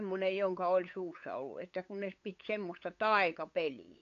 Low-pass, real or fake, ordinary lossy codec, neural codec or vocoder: 7.2 kHz; fake; AAC, 48 kbps; codec, 16 kHz, 8 kbps, FunCodec, trained on LibriTTS, 25 frames a second